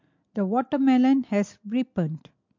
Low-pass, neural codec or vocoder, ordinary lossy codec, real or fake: 7.2 kHz; none; MP3, 48 kbps; real